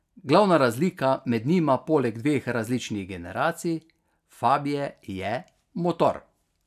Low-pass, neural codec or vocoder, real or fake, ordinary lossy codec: 14.4 kHz; none; real; none